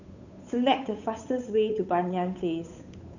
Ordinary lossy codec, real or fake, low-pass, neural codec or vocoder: none; fake; 7.2 kHz; codec, 16 kHz, 8 kbps, FunCodec, trained on Chinese and English, 25 frames a second